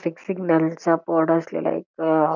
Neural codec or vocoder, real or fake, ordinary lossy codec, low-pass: none; real; none; 7.2 kHz